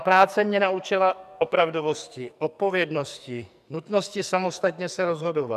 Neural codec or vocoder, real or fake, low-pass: codec, 44.1 kHz, 2.6 kbps, SNAC; fake; 14.4 kHz